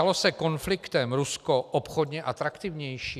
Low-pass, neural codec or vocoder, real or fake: 14.4 kHz; none; real